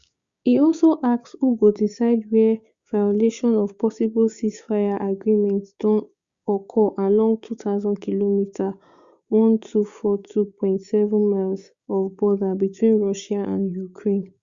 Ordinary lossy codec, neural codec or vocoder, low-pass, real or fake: Opus, 64 kbps; codec, 16 kHz, 6 kbps, DAC; 7.2 kHz; fake